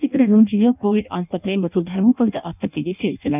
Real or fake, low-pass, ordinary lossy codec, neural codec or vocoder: fake; 3.6 kHz; none; codec, 16 kHz in and 24 kHz out, 0.6 kbps, FireRedTTS-2 codec